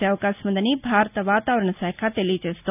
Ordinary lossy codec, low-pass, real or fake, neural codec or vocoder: none; 3.6 kHz; real; none